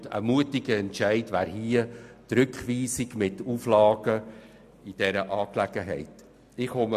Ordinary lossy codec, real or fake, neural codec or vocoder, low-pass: MP3, 96 kbps; real; none; 14.4 kHz